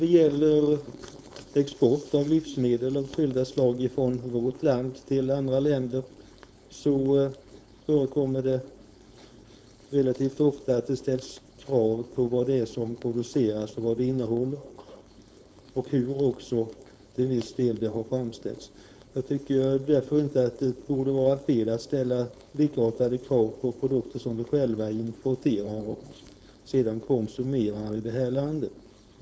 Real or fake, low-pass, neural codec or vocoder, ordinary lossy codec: fake; none; codec, 16 kHz, 4.8 kbps, FACodec; none